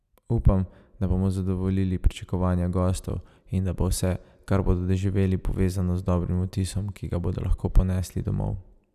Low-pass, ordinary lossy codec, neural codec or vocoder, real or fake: 14.4 kHz; none; none; real